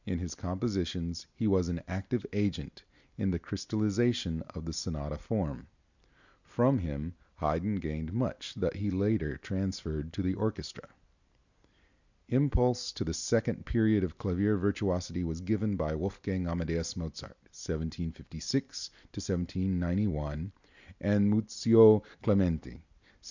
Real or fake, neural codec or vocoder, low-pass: real; none; 7.2 kHz